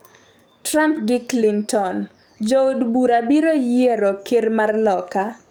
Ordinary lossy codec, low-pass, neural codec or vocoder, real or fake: none; none; codec, 44.1 kHz, 7.8 kbps, DAC; fake